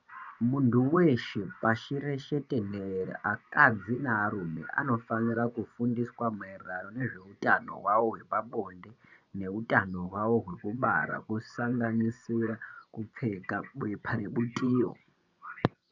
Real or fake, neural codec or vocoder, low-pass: fake; vocoder, 24 kHz, 100 mel bands, Vocos; 7.2 kHz